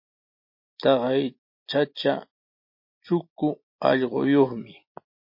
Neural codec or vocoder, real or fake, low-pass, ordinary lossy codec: none; real; 5.4 kHz; MP3, 32 kbps